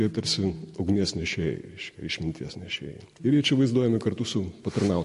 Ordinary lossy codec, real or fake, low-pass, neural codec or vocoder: MP3, 48 kbps; real; 14.4 kHz; none